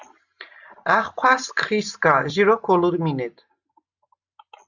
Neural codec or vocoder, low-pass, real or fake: none; 7.2 kHz; real